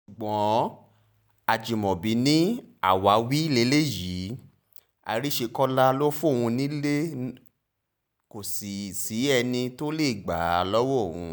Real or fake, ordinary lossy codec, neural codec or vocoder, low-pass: real; none; none; none